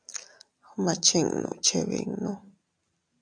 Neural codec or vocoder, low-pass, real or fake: none; 9.9 kHz; real